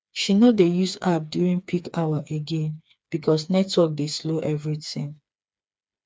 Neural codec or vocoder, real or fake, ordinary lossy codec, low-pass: codec, 16 kHz, 4 kbps, FreqCodec, smaller model; fake; none; none